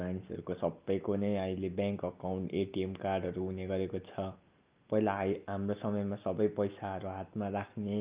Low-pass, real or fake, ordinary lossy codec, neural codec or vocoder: 3.6 kHz; real; Opus, 32 kbps; none